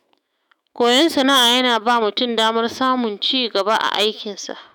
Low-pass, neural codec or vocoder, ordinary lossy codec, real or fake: none; autoencoder, 48 kHz, 128 numbers a frame, DAC-VAE, trained on Japanese speech; none; fake